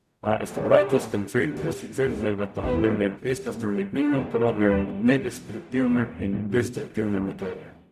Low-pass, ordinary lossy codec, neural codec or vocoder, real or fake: 14.4 kHz; none; codec, 44.1 kHz, 0.9 kbps, DAC; fake